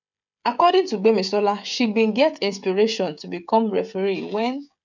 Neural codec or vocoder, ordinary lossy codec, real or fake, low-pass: codec, 16 kHz, 16 kbps, FreqCodec, smaller model; none; fake; 7.2 kHz